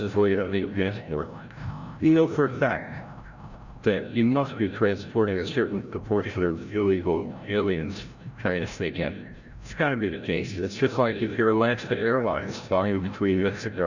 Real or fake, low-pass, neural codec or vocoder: fake; 7.2 kHz; codec, 16 kHz, 0.5 kbps, FreqCodec, larger model